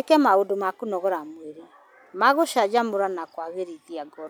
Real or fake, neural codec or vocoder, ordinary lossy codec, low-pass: real; none; none; none